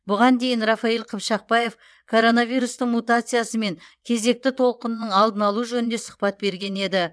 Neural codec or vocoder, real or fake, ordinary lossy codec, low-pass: vocoder, 22.05 kHz, 80 mel bands, WaveNeXt; fake; none; none